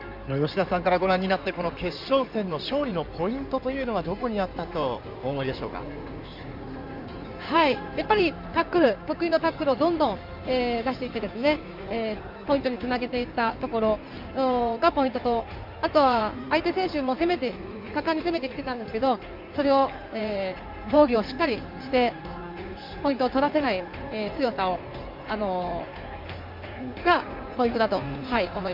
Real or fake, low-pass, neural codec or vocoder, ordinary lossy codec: fake; 5.4 kHz; codec, 16 kHz in and 24 kHz out, 2.2 kbps, FireRedTTS-2 codec; MP3, 48 kbps